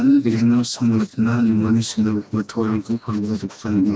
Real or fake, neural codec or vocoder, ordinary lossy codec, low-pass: fake; codec, 16 kHz, 1 kbps, FreqCodec, smaller model; none; none